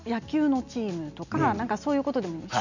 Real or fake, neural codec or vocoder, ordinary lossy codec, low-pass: real; none; none; 7.2 kHz